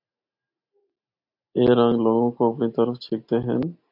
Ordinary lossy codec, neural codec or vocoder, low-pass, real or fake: AAC, 48 kbps; none; 5.4 kHz; real